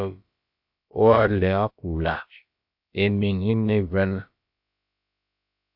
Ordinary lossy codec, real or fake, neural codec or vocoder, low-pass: AAC, 48 kbps; fake; codec, 16 kHz, about 1 kbps, DyCAST, with the encoder's durations; 5.4 kHz